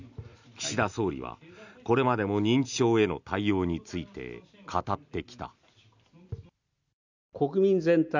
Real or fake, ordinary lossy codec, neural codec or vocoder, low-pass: real; none; none; 7.2 kHz